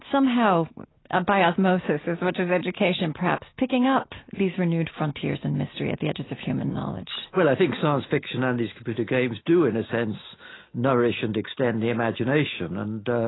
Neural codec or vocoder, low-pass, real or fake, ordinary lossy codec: none; 7.2 kHz; real; AAC, 16 kbps